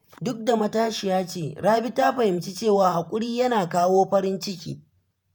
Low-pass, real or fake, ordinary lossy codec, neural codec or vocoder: none; fake; none; vocoder, 48 kHz, 128 mel bands, Vocos